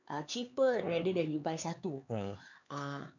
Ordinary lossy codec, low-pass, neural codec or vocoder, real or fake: none; 7.2 kHz; codec, 16 kHz, 4 kbps, X-Codec, HuBERT features, trained on LibriSpeech; fake